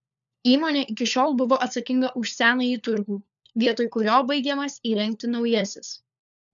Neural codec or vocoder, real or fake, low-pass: codec, 16 kHz, 4 kbps, FunCodec, trained on LibriTTS, 50 frames a second; fake; 7.2 kHz